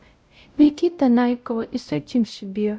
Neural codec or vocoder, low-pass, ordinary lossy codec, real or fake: codec, 16 kHz, 0.5 kbps, X-Codec, WavLM features, trained on Multilingual LibriSpeech; none; none; fake